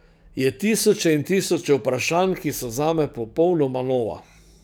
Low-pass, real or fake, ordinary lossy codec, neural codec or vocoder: none; fake; none; codec, 44.1 kHz, 7.8 kbps, DAC